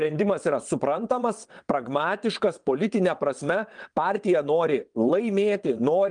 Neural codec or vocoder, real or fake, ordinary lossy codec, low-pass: vocoder, 22.05 kHz, 80 mel bands, WaveNeXt; fake; Opus, 32 kbps; 9.9 kHz